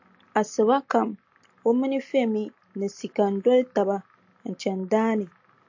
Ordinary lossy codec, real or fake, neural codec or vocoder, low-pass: AAC, 48 kbps; real; none; 7.2 kHz